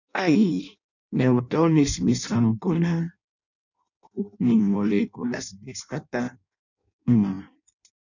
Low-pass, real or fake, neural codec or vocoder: 7.2 kHz; fake; codec, 16 kHz in and 24 kHz out, 0.6 kbps, FireRedTTS-2 codec